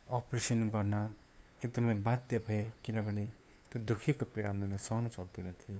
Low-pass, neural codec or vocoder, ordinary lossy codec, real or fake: none; codec, 16 kHz, 2 kbps, FunCodec, trained on LibriTTS, 25 frames a second; none; fake